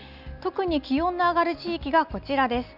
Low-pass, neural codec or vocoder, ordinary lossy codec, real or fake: 5.4 kHz; none; none; real